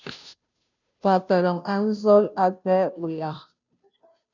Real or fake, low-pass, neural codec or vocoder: fake; 7.2 kHz; codec, 16 kHz, 0.5 kbps, FunCodec, trained on Chinese and English, 25 frames a second